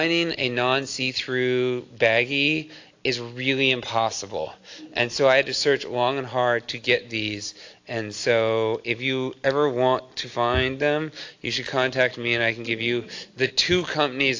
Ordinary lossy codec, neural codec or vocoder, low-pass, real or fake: AAC, 48 kbps; none; 7.2 kHz; real